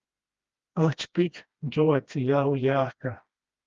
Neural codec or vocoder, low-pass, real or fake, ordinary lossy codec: codec, 16 kHz, 1 kbps, FreqCodec, smaller model; 7.2 kHz; fake; Opus, 32 kbps